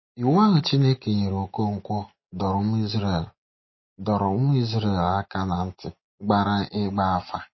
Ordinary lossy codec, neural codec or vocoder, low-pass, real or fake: MP3, 24 kbps; none; 7.2 kHz; real